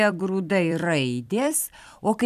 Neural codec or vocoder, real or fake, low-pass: vocoder, 44.1 kHz, 128 mel bands every 256 samples, BigVGAN v2; fake; 14.4 kHz